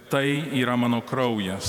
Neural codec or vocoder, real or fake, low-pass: vocoder, 48 kHz, 128 mel bands, Vocos; fake; 19.8 kHz